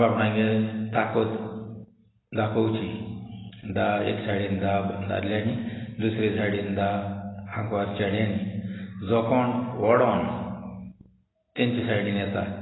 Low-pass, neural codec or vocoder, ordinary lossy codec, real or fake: 7.2 kHz; none; AAC, 16 kbps; real